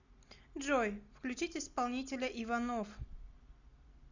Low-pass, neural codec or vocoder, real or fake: 7.2 kHz; none; real